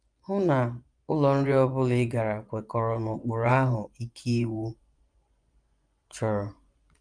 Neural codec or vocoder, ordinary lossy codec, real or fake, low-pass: vocoder, 24 kHz, 100 mel bands, Vocos; Opus, 32 kbps; fake; 9.9 kHz